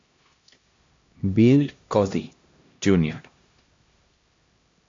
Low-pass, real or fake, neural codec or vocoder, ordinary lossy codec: 7.2 kHz; fake; codec, 16 kHz, 1 kbps, X-Codec, HuBERT features, trained on LibriSpeech; AAC, 48 kbps